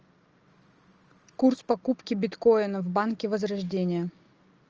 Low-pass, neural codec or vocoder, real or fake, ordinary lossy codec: 7.2 kHz; none; real; Opus, 24 kbps